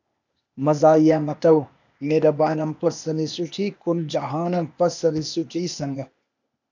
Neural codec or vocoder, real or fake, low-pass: codec, 16 kHz, 0.8 kbps, ZipCodec; fake; 7.2 kHz